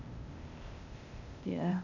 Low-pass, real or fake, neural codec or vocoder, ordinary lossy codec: 7.2 kHz; fake; codec, 16 kHz, 0.8 kbps, ZipCodec; none